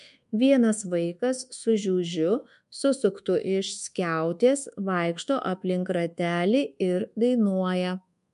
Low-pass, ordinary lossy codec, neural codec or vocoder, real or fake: 10.8 kHz; MP3, 64 kbps; codec, 24 kHz, 1.2 kbps, DualCodec; fake